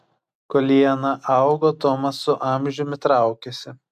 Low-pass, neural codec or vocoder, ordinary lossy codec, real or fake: 14.4 kHz; none; AAC, 96 kbps; real